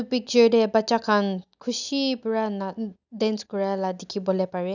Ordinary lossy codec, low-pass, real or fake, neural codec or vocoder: none; 7.2 kHz; real; none